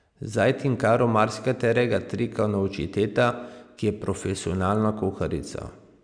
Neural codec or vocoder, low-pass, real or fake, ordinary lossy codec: none; 9.9 kHz; real; none